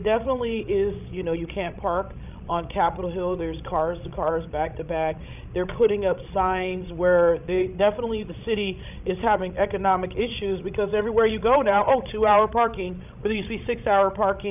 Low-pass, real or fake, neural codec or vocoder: 3.6 kHz; fake; codec, 16 kHz, 16 kbps, FreqCodec, larger model